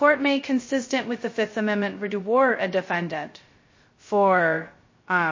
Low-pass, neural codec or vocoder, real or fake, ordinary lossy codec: 7.2 kHz; codec, 16 kHz, 0.2 kbps, FocalCodec; fake; MP3, 32 kbps